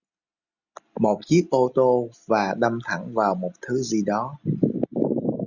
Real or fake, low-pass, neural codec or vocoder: real; 7.2 kHz; none